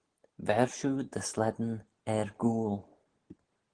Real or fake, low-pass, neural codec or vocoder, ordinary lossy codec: real; 9.9 kHz; none; Opus, 16 kbps